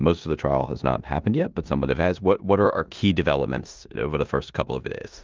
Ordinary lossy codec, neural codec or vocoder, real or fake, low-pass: Opus, 32 kbps; codec, 16 kHz in and 24 kHz out, 0.9 kbps, LongCat-Audio-Codec, four codebook decoder; fake; 7.2 kHz